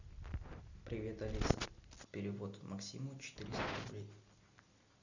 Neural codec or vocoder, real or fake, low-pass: none; real; 7.2 kHz